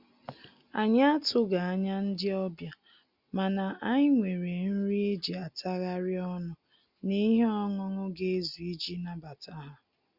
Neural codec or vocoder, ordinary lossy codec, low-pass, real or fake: none; Opus, 64 kbps; 5.4 kHz; real